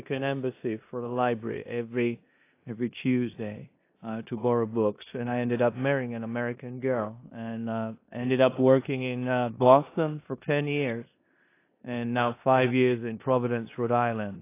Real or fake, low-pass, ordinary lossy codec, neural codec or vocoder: fake; 3.6 kHz; AAC, 24 kbps; codec, 16 kHz in and 24 kHz out, 0.9 kbps, LongCat-Audio-Codec, four codebook decoder